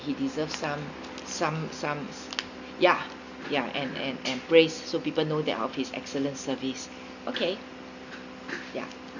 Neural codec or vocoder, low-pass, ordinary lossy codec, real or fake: none; 7.2 kHz; none; real